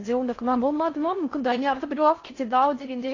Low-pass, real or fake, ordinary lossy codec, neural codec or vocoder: 7.2 kHz; fake; AAC, 32 kbps; codec, 16 kHz in and 24 kHz out, 0.6 kbps, FocalCodec, streaming, 4096 codes